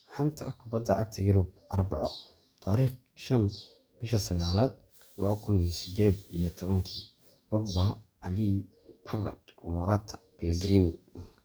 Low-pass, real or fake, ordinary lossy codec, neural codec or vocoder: none; fake; none; codec, 44.1 kHz, 2.6 kbps, DAC